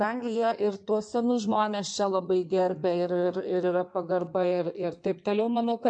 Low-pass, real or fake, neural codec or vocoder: 9.9 kHz; fake; codec, 16 kHz in and 24 kHz out, 1.1 kbps, FireRedTTS-2 codec